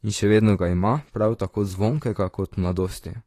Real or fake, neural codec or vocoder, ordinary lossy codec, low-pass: fake; vocoder, 44.1 kHz, 128 mel bands, Pupu-Vocoder; AAC, 48 kbps; 14.4 kHz